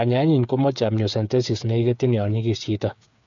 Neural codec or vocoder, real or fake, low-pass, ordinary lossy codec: codec, 16 kHz, 8 kbps, FreqCodec, smaller model; fake; 7.2 kHz; none